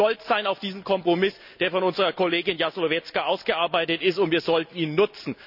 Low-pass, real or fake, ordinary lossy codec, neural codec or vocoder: 5.4 kHz; real; none; none